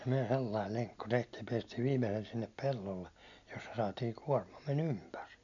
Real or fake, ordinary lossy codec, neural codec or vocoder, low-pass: real; MP3, 96 kbps; none; 7.2 kHz